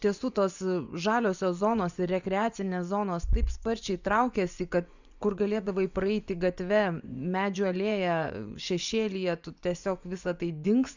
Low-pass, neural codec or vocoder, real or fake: 7.2 kHz; vocoder, 24 kHz, 100 mel bands, Vocos; fake